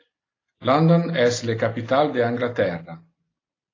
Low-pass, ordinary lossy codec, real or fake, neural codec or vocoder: 7.2 kHz; AAC, 32 kbps; real; none